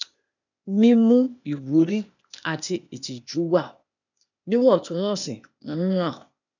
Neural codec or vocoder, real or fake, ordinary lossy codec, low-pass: codec, 16 kHz, 0.8 kbps, ZipCodec; fake; none; 7.2 kHz